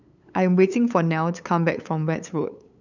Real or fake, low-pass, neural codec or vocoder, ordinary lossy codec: fake; 7.2 kHz; codec, 16 kHz, 8 kbps, FunCodec, trained on LibriTTS, 25 frames a second; none